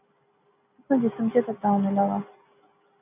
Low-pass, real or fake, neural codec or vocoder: 3.6 kHz; real; none